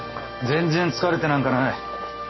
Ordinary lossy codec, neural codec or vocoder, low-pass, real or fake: MP3, 24 kbps; none; 7.2 kHz; real